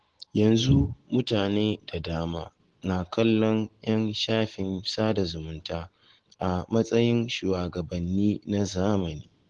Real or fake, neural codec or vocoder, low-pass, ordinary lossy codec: real; none; 10.8 kHz; Opus, 16 kbps